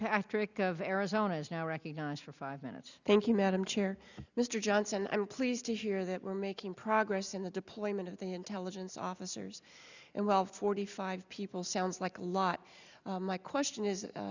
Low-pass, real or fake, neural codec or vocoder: 7.2 kHz; real; none